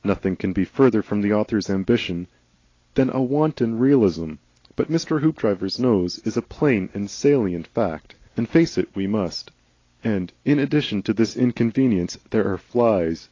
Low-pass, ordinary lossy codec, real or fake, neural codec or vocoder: 7.2 kHz; AAC, 32 kbps; real; none